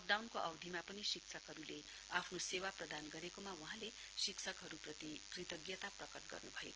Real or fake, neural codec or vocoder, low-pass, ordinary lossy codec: real; none; 7.2 kHz; Opus, 16 kbps